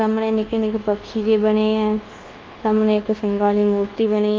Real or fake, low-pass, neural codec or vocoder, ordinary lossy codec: fake; 7.2 kHz; codec, 24 kHz, 1.2 kbps, DualCodec; Opus, 32 kbps